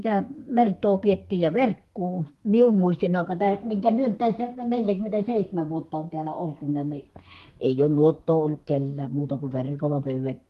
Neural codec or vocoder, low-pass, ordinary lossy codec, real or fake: codec, 32 kHz, 1.9 kbps, SNAC; 14.4 kHz; Opus, 24 kbps; fake